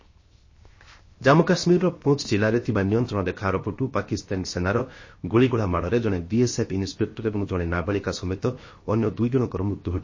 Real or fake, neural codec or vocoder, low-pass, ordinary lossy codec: fake; codec, 16 kHz, 0.7 kbps, FocalCodec; 7.2 kHz; MP3, 32 kbps